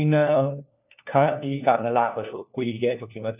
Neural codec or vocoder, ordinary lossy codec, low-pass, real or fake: codec, 16 kHz, 1 kbps, FunCodec, trained on LibriTTS, 50 frames a second; none; 3.6 kHz; fake